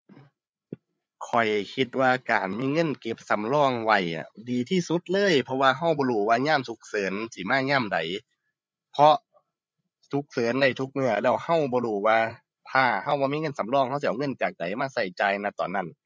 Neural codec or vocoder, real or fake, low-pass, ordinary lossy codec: codec, 16 kHz, 8 kbps, FreqCodec, larger model; fake; none; none